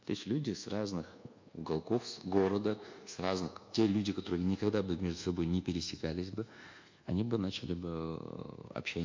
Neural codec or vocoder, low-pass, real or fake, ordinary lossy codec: codec, 24 kHz, 1.2 kbps, DualCodec; 7.2 kHz; fake; MP3, 48 kbps